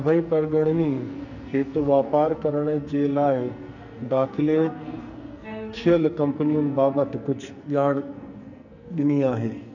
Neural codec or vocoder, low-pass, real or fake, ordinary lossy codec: codec, 44.1 kHz, 2.6 kbps, SNAC; 7.2 kHz; fake; none